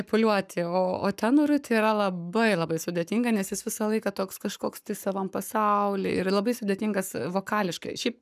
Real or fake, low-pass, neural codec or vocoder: fake; 14.4 kHz; codec, 44.1 kHz, 7.8 kbps, Pupu-Codec